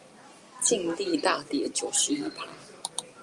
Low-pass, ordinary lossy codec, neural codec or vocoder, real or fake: 10.8 kHz; Opus, 32 kbps; none; real